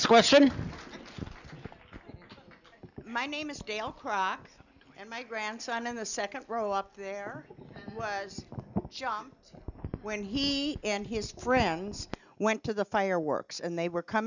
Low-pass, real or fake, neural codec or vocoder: 7.2 kHz; real; none